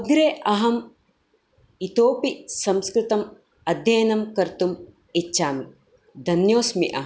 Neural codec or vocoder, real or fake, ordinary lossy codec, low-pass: none; real; none; none